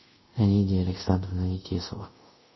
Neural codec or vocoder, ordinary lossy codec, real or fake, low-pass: codec, 24 kHz, 0.5 kbps, DualCodec; MP3, 24 kbps; fake; 7.2 kHz